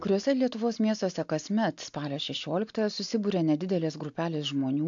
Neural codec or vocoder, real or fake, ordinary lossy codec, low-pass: none; real; AAC, 64 kbps; 7.2 kHz